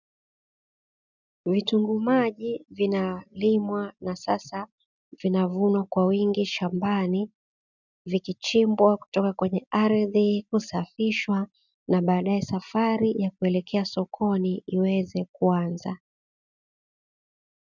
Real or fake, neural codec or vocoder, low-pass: real; none; 7.2 kHz